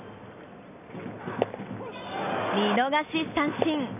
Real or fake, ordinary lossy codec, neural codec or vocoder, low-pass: real; none; none; 3.6 kHz